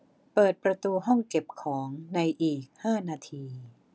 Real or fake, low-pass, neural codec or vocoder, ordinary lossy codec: real; none; none; none